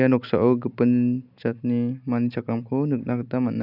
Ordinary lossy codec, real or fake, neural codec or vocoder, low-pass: none; real; none; 5.4 kHz